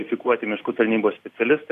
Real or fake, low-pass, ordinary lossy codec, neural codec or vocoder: real; 14.4 kHz; AAC, 64 kbps; none